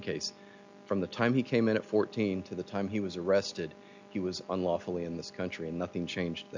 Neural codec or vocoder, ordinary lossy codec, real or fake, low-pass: none; MP3, 64 kbps; real; 7.2 kHz